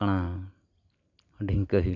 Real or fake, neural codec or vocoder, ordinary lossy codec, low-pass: real; none; none; 7.2 kHz